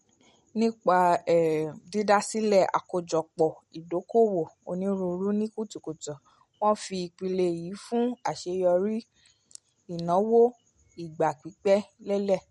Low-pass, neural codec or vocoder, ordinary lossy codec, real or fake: 19.8 kHz; none; MP3, 48 kbps; real